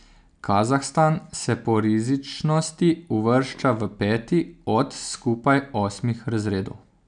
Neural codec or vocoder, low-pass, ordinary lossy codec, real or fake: none; 9.9 kHz; none; real